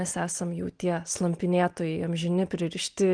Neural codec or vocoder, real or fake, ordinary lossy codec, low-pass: none; real; Opus, 24 kbps; 9.9 kHz